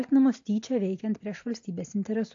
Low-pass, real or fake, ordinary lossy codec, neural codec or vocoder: 7.2 kHz; real; AAC, 48 kbps; none